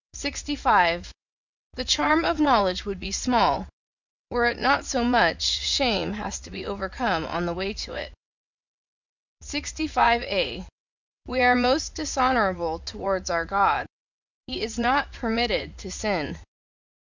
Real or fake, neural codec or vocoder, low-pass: fake; vocoder, 44.1 kHz, 80 mel bands, Vocos; 7.2 kHz